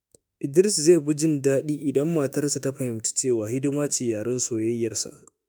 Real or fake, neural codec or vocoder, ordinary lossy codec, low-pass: fake; autoencoder, 48 kHz, 32 numbers a frame, DAC-VAE, trained on Japanese speech; none; none